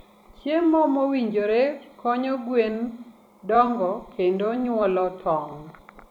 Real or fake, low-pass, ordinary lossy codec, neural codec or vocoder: fake; 19.8 kHz; none; vocoder, 44.1 kHz, 128 mel bands every 256 samples, BigVGAN v2